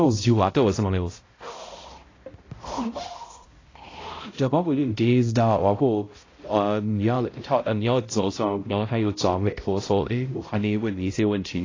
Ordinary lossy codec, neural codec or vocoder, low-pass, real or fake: AAC, 32 kbps; codec, 16 kHz, 0.5 kbps, X-Codec, HuBERT features, trained on balanced general audio; 7.2 kHz; fake